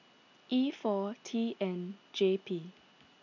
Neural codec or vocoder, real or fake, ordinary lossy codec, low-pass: none; real; none; 7.2 kHz